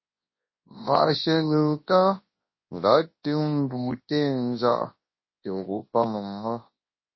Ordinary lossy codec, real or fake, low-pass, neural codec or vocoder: MP3, 24 kbps; fake; 7.2 kHz; codec, 24 kHz, 0.9 kbps, WavTokenizer, large speech release